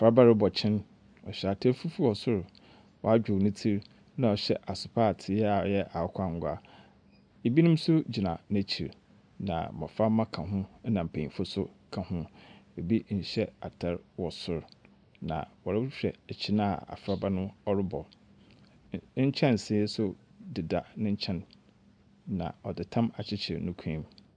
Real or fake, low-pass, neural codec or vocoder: real; 9.9 kHz; none